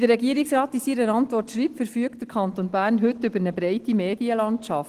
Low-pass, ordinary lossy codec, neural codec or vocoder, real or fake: 14.4 kHz; Opus, 16 kbps; none; real